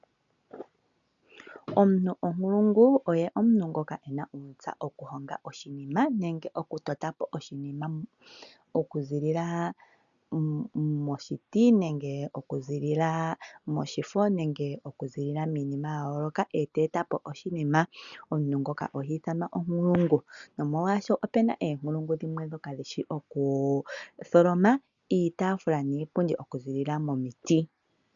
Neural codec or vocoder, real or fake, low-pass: none; real; 7.2 kHz